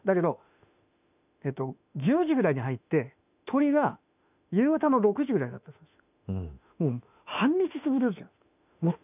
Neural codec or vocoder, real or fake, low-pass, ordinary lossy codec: autoencoder, 48 kHz, 32 numbers a frame, DAC-VAE, trained on Japanese speech; fake; 3.6 kHz; none